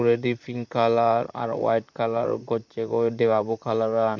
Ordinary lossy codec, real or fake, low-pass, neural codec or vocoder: none; fake; 7.2 kHz; vocoder, 44.1 kHz, 128 mel bands, Pupu-Vocoder